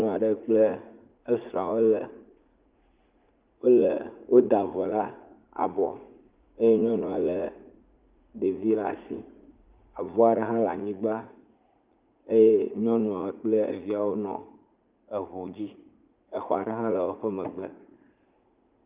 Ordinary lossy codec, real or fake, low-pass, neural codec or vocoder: Opus, 32 kbps; fake; 3.6 kHz; vocoder, 44.1 kHz, 80 mel bands, Vocos